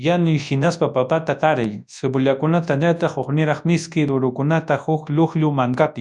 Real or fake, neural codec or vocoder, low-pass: fake; codec, 24 kHz, 0.9 kbps, WavTokenizer, large speech release; 10.8 kHz